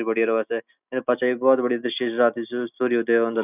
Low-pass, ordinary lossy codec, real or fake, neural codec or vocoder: 3.6 kHz; none; real; none